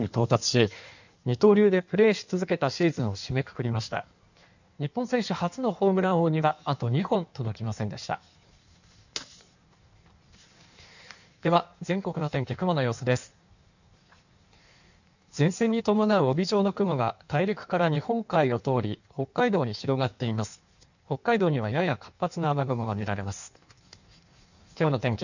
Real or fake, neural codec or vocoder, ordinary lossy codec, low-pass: fake; codec, 16 kHz in and 24 kHz out, 1.1 kbps, FireRedTTS-2 codec; none; 7.2 kHz